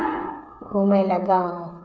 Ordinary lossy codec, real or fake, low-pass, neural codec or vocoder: none; fake; none; codec, 16 kHz, 8 kbps, FreqCodec, smaller model